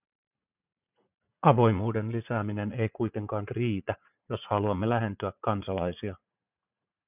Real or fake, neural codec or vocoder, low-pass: fake; vocoder, 44.1 kHz, 80 mel bands, Vocos; 3.6 kHz